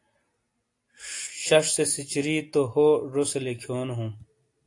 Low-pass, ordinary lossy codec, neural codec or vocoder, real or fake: 10.8 kHz; AAC, 48 kbps; none; real